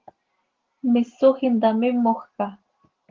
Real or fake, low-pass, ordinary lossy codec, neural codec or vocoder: real; 7.2 kHz; Opus, 16 kbps; none